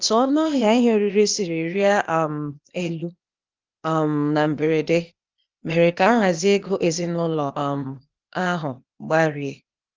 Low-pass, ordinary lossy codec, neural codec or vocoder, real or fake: 7.2 kHz; Opus, 32 kbps; codec, 16 kHz, 0.8 kbps, ZipCodec; fake